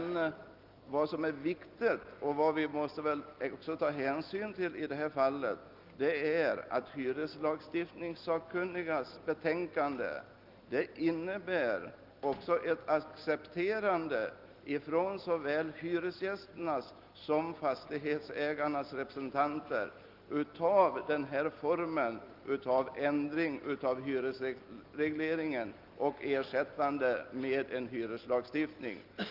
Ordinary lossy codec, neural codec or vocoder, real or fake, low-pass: Opus, 24 kbps; none; real; 5.4 kHz